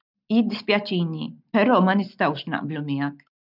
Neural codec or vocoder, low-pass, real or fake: none; 5.4 kHz; real